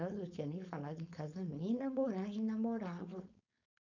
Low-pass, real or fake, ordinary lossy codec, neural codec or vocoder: 7.2 kHz; fake; none; codec, 16 kHz, 4.8 kbps, FACodec